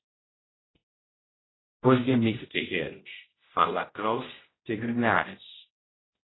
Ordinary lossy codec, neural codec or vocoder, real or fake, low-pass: AAC, 16 kbps; codec, 16 kHz, 0.5 kbps, X-Codec, HuBERT features, trained on general audio; fake; 7.2 kHz